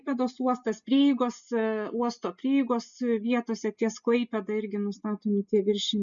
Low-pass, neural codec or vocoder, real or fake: 7.2 kHz; none; real